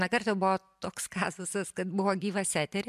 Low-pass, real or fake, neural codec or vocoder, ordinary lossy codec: 14.4 kHz; real; none; MP3, 96 kbps